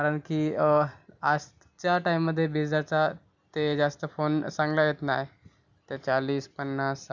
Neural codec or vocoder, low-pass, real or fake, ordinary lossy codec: none; 7.2 kHz; real; none